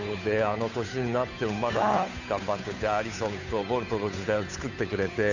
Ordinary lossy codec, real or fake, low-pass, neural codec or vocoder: none; fake; 7.2 kHz; codec, 16 kHz, 8 kbps, FunCodec, trained on Chinese and English, 25 frames a second